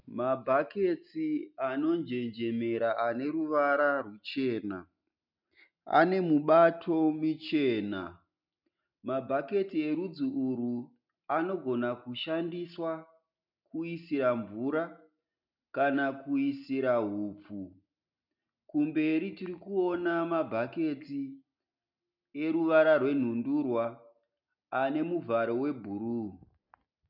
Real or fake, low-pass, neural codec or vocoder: real; 5.4 kHz; none